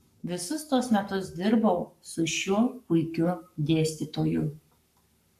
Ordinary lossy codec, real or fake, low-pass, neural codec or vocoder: AAC, 96 kbps; fake; 14.4 kHz; codec, 44.1 kHz, 7.8 kbps, Pupu-Codec